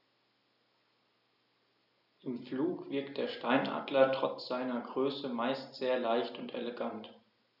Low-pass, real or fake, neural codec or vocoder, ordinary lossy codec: 5.4 kHz; real; none; none